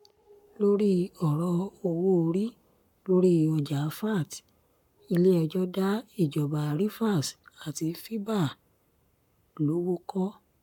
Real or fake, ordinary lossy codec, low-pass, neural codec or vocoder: fake; none; 19.8 kHz; codec, 44.1 kHz, 7.8 kbps, Pupu-Codec